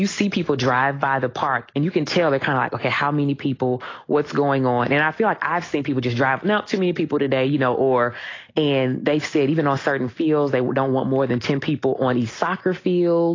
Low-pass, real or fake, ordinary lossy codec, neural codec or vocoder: 7.2 kHz; real; AAC, 32 kbps; none